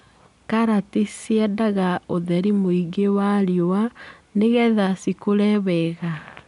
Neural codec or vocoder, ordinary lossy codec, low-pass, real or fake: none; none; 10.8 kHz; real